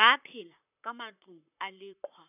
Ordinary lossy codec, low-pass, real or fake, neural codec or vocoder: none; 3.6 kHz; fake; vocoder, 44.1 kHz, 128 mel bands, Pupu-Vocoder